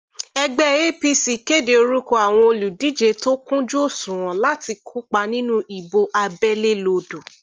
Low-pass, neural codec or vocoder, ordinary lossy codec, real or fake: 7.2 kHz; none; Opus, 24 kbps; real